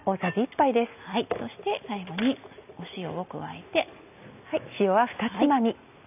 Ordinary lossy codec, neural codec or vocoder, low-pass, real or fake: none; none; 3.6 kHz; real